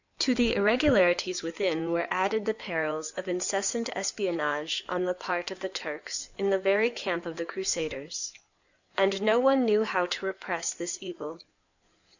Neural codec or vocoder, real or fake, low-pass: codec, 16 kHz in and 24 kHz out, 2.2 kbps, FireRedTTS-2 codec; fake; 7.2 kHz